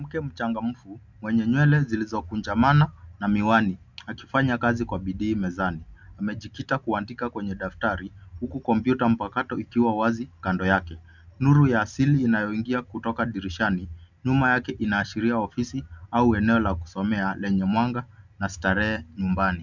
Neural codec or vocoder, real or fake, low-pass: none; real; 7.2 kHz